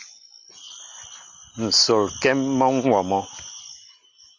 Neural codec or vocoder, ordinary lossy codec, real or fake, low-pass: none; Opus, 64 kbps; real; 7.2 kHz